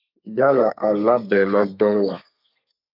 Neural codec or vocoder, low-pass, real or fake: codec, 32 kHz, 1.9 kbps, SNAC; 5.4 kHz; fake